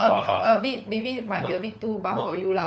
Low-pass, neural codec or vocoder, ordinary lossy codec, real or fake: none; codec, 16 kHz, 4 kbps, FunCodec, trained on LibriTTS, 50 frames a second; none; fake